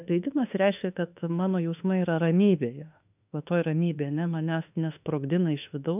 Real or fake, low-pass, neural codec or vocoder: fake; 3.6 kHz; autoencoder, 48 kHz, 32 numbers a frame, DAC-VAE, trained on Japanese speech